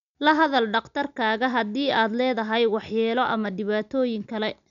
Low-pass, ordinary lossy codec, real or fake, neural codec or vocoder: 7.2 kHz; none; real; none